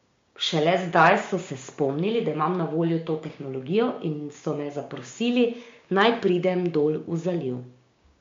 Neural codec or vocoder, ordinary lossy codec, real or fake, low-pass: codec, 16 kHz, 6 kbps, DAC; MP3, 48 kbps; fake; 7.2 kHz